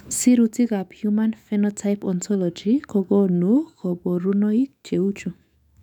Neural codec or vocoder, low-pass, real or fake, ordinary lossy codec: autoencoder, 48 kHz, 128 numbers a frame, DAC-VAE, trained on Japanese speech; 19.8 kHz; fake; none